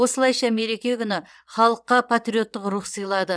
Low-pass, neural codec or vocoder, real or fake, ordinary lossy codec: none; vocoder, 22.05 kHz, 80 mel bands, WaveNeXt; fake; none